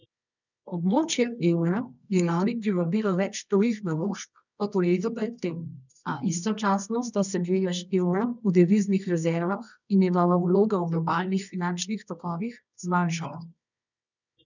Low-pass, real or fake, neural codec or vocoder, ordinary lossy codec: 7.2 kHz; fake; codec, 24 kHz, 0.9 kbps, WavTokenizer, medium music audio release; none